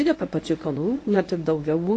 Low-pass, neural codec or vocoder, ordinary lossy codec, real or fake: 10.8 kHz; codec, 24 kHz, 0.9 kbps, WavTokenizer, medium speech release version 1; AAC, 32 kbps; fake